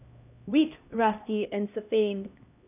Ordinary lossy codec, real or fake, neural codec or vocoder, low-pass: none; fake; codec, 16 kHz, 1 kbps, X-Codec, HuBERT features, trained on LibriSpeech; 3.6 kHz